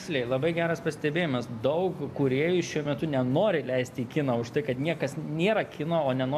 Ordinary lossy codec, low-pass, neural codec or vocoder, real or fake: AAC, 96 kbps; 14.4 kHz; none; real